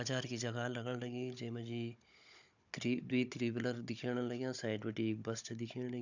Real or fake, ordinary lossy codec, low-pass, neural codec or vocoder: fake; none; 7.2 kHz; codec, 16 kHz, 16 kbps, FunCodec, trained on Chinese and English, 50 frames a second